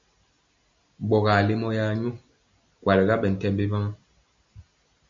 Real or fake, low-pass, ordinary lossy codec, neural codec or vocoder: real; 7.2 kHz; MP3, 48 kbps; none